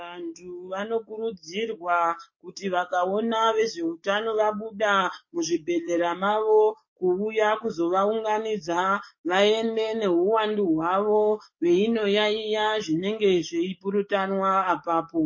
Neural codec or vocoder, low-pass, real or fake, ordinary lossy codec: codec, 44.1 kHz, 7.8 kbps, DAC; 7.2 kHz; fake; MP3, 32 kbps